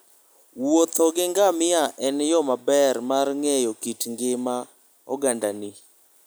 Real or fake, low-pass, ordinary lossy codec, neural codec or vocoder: real; none; none; none